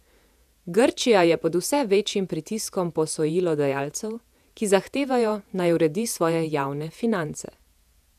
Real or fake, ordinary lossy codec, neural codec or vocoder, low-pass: fake; none; vocoder, 48 kHz, 128 mel bands, Vocos; 14.4 kHz